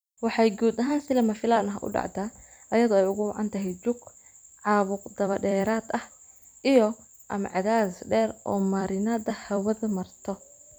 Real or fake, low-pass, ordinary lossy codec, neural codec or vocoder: fake; none; none; vocoder, 44.1 kHz, 128 mel bands every 256 samples, BigVGAN v2